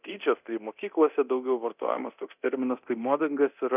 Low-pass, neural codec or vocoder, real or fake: 3.6 kHz; codec, 24 kHz, 0.9 kbps, DualCodec; fake